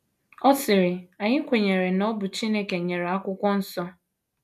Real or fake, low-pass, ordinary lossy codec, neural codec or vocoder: real; 14.4 kHz; none; none